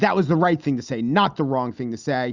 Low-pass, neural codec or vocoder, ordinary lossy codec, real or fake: 7.2 kHz; none; Opus, 64 kbps; real